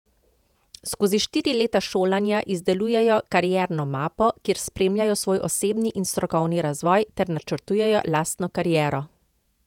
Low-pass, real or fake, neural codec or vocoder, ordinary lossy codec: 19.8 kHz; fake; vocoder, 48 kHz, 128 mel bands, Vocos; none